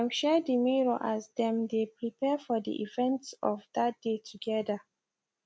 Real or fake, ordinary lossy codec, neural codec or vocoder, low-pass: real; none; none; none